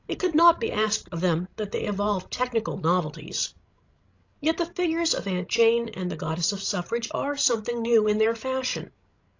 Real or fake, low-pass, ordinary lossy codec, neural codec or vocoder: fake; 7.2 kHz; AAC, 48 kbps; codec, 16 kHz, 16 kbps, FreqCodec, larger model